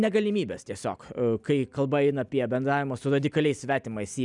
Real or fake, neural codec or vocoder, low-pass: real; none; 10.8 kHz